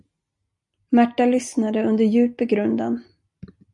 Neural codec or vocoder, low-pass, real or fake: none; 10.8 kHz; real